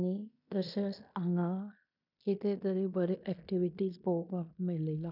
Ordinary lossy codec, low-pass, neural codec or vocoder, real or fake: none; 5.4 kHz; codec, 16 kHz in and 24 kHz out, 0.9 kbps, LongCat-Audio-Codec, fine tuned four codebook decoder; fake